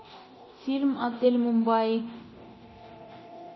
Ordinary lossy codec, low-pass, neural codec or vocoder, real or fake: MP3, 24 kbps; 7.2 kHz; codec, 24 kHz, 0.9 kbps, DualCodec; fake